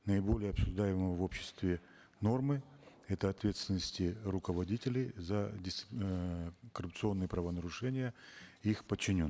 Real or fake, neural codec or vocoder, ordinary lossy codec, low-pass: real; none; none; none